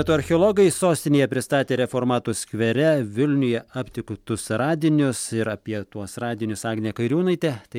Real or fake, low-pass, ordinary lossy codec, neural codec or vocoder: real; 19.8 kHz; MP3, 96 kbps; none